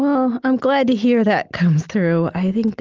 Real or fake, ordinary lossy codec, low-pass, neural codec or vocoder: real; Opus, 32 kbps; 7.2 kHz; none